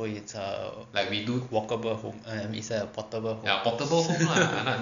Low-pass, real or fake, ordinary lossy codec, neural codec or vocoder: 7.2 kHz; real; none; none